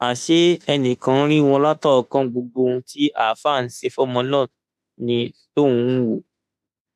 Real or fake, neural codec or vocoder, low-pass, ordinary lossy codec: fake; autoencoder, 48 kHz, 32 numbers a frame, DAC-VAE, trained on Japanese speech; 14.4 kHz; none